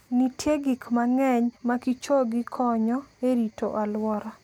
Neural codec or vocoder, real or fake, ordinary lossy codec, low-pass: none; real; none; 19.8 kHz